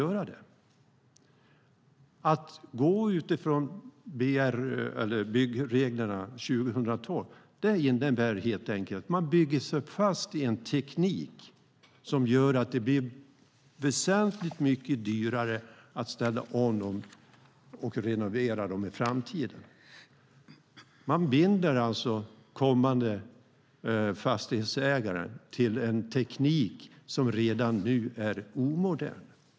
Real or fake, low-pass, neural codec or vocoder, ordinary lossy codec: real; none; none; none